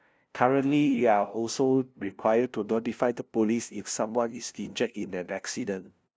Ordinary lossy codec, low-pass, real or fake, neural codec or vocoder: none; none; fake; codec, 16 kHz, 0.5 kbps, FunCodec, trained on LibriTTS, 25 frames a second